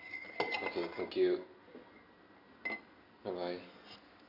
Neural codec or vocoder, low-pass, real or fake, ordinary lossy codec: none; 5.4 kHz; real; none